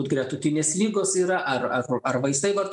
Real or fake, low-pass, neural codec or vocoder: real; 10.8 kHz; none